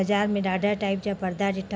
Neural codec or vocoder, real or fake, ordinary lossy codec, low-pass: none; real; none; none